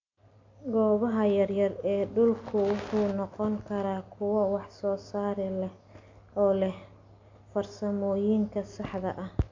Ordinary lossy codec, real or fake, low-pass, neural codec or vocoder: MP3, 48 kbps; real; 7.2 kHz; none